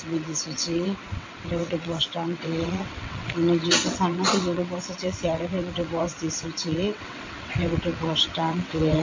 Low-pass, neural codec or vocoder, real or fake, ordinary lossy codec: 7.2 kHz; vocoder, 22.05 kHz, 80 mel bands, WaveNeXt; fake; MP3, 48 kbps